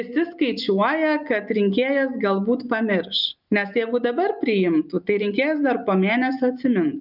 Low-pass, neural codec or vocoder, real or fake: 5.4 kHz; none; real